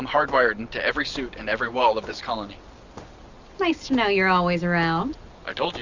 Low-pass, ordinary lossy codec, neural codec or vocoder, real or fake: 7.2 kHz; Opus, 64 kbps; none; real